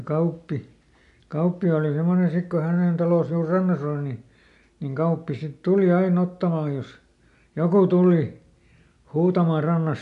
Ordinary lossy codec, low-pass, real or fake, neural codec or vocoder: none; 10.8 kHz; real; none